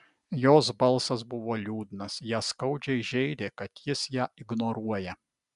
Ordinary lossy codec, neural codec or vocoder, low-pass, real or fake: MP3, 96 kbps; none; 10.8 kHz; real